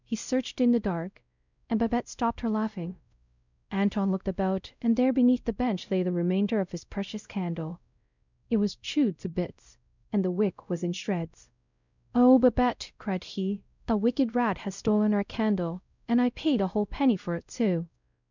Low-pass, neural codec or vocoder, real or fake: 7.2 kHz; codec, 16 kHz, 0.5 kbps, X-Codec, WavLM features, trained on Multilingual LibriSpeech; fake